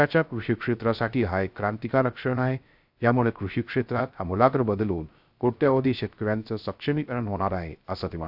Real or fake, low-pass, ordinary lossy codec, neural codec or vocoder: fake; 5.4 kHz; AAC, 48 kbps; codec, 16 kHz, 0.3 kbps, FocalCodec